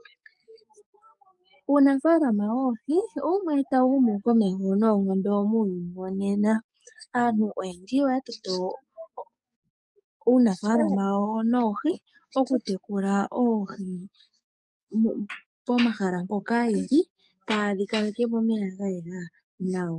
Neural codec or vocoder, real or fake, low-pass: codec, 44.1 kHz, 7.8 kbps, DAC; fake; 10.8 kHz